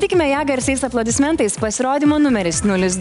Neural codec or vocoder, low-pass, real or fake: none; 10.8 kHz; real